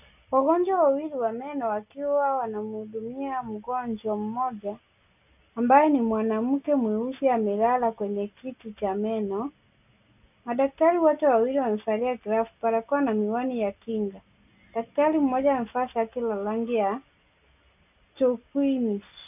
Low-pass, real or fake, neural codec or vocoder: 3.6 kHz; real; none